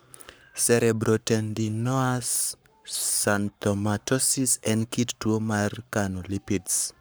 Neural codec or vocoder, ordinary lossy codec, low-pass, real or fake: codec, 44.1 kHz, 7.8 kbps, Pupu-Codec; none; none; fake